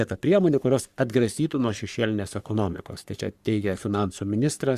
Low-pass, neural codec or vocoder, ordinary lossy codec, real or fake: 14.4 kHz; codec, 44.1 kHz, 3.4 kbps, Pupu-Codec; Opus, 64 kbps; fake